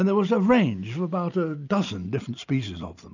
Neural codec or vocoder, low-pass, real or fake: none; 7.2 kHz; real